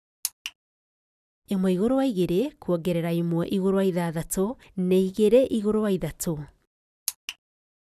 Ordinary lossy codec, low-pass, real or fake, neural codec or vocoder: none; 14.4 kHz; real; none